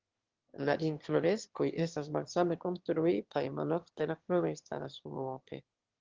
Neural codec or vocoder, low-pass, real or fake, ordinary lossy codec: autoencoder, 22.05 kHz, a latent of 192 numbers a frame, VITS, trained on one speaker; 7.2 kHz; fake; Opus, 16 kbps